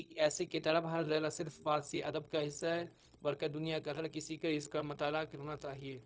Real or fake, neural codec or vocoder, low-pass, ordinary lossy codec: fake; codec, 16 kHz, 0.4 kbps, LongCat-Audio-Codec; none; none